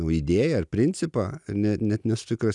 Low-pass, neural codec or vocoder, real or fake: 10.8 kHz; none; real